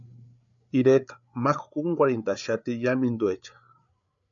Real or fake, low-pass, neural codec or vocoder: fake; 7.2 kHz; codec, 16 kHz, 8 kbps, FreqCodec, larger model